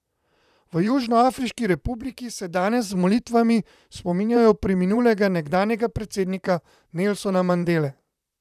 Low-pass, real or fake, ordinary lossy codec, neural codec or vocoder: 14.4 kHz; fake; none; vocoder, 44.1 kHz, 128 mel bands, Pupu-Vocoder